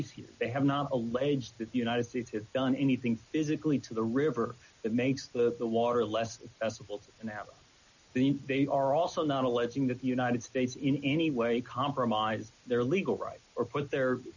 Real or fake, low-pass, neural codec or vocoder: real; 7.2 kHz; none